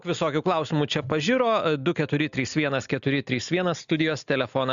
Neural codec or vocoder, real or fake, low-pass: none; real; 7.2 kHz